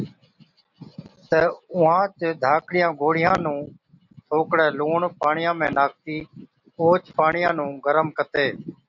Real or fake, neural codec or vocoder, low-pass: real; none; 7.2 kHz